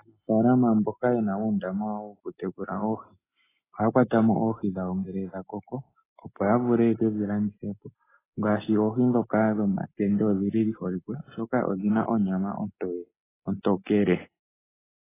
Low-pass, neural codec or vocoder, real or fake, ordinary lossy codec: 3.6 kHz; none; real; AAC, 16 kbps